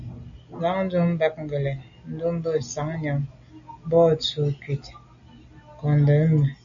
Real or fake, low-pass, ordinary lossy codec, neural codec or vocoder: real; 7.2 kHz; AAC, 64 kbps; none